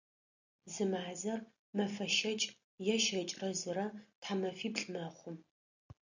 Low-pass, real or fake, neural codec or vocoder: 7.2 kHz; real; none